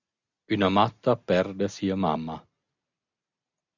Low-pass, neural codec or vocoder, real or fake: 7.2 kHz; none; real